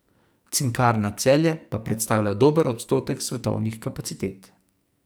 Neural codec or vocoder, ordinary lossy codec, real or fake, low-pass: codec, 44.1 kHz, 2.6 kbps, SNAC; none; fake; none